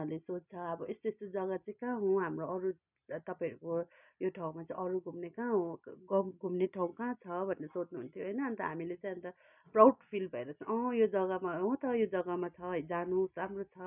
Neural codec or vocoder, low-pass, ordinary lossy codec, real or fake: none; 3.6 kHz; none; real